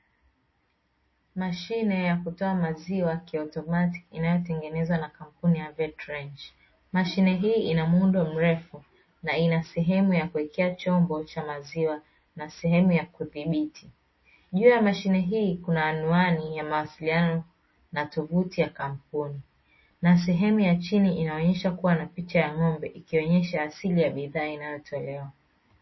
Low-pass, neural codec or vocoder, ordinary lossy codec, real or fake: 7.2 kHz; none; MP3, 24 kbps; real